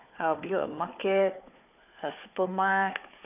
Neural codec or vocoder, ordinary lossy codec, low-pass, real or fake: codec, 16 kHz, 4 kbps, FunCodec, trained on Chinese and English, 50 frames a second; none; 3.6 kHz; fake